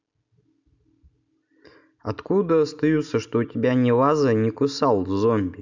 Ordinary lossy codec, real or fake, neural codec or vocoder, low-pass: none; real; none; 7.2 kHz